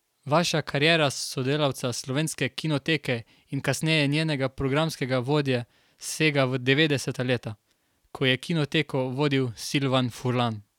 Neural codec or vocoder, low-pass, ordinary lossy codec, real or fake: none; 19.8 kHz; none; real